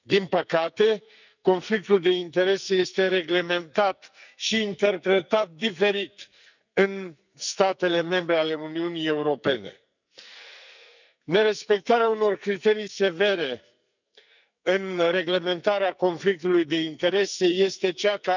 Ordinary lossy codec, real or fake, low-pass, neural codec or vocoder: none; fake; 7.2 kHz; codec, 44.1 kHz, 2.6 kbps, SNAC